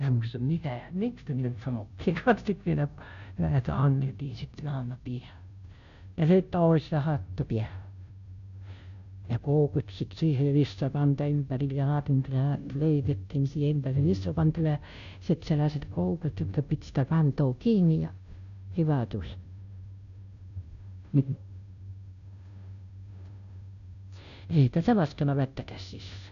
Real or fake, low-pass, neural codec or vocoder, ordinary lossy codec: fake; 7.2 kHz; codec, 16 kHz, 0.5 kbps, FunCodec, trained on Chinese and English, 25 frames a second; none